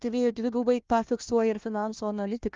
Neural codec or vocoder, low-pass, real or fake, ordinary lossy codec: codec, 16 kHz, 1 kbps, FunCodec, trained on Chinese and English, 50 frames a second; 7.2 kHz; fake; Opus, 32 kbps